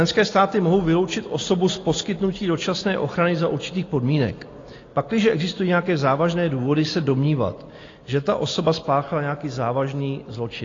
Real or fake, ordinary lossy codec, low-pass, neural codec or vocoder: real; AAC, 32 kbps; 7.2 kHz; none